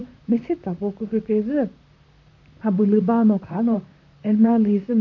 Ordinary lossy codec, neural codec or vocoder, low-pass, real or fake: AAC, 48 kbps; vocoder, 44.1 kHz, 128 mel bands, Pupu-Vocoder; 7.2 kHz; fake